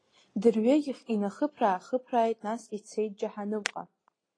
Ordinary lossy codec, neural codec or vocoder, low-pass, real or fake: AAC, 32 kbps; none; 9.9 kHz; real